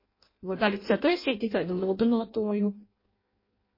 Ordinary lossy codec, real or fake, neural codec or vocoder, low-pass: MP3, 24 kbps; fake; codec, 16 kHz in and 24 kHz out, 0.6 kbps, FireRedTTS-2 codec; 5.4 kHz